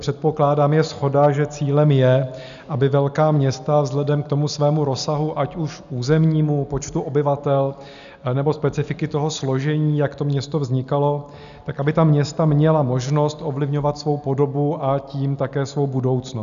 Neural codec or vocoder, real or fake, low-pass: none; real; 7.2 kHz